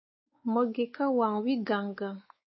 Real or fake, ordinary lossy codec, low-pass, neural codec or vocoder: fake; MP3, 24 kbps; 7.2 kHz; codec, 16 kHz, 4 kbps, X-Codec, WavLM features, trained on Multilingual LibriSpeech